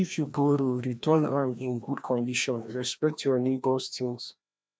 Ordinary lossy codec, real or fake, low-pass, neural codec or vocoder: none; fake; none; codec, 16 kHz, 1 kbps, FreqCodec, larger model